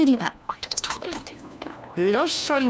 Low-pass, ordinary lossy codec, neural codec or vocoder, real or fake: none; none; codec, 16 kHz, 1 kbps, FunCodec, trained on LibriTTS, 50 frames a second; fake